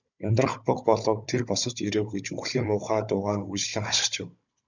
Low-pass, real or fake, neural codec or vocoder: 7.2 kHz; fake; codec, 16 kHz, 16 kbps, FunCodec, trained on Chinese and English, 50 frames a second